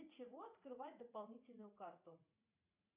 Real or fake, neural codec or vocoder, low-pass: fake; vocoder, 44.1 kHz, 80 mel bands, Vocos; 3.6 kHz